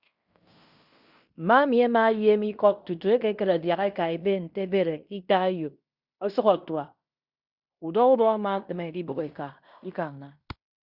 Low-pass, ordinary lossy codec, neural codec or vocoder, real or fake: 5.4 kHz; Opus, 64 kbps; codec, 16 kHz in and 24 kHz out, 0.9 kbps, LongCat-Audio-Codec, fine tuned four codebook decoder; fake